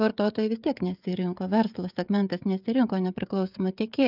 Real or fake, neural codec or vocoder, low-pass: fake; codec, 16 kHz, 16 kbps, FreqCodec, smaller model; 5.4 kHz